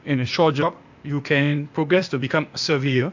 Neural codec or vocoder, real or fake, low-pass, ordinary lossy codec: codec, 16 kHz, 0.8 kbps, ZipCodec; fake; 7.2 kHz; AAC, 48 kbps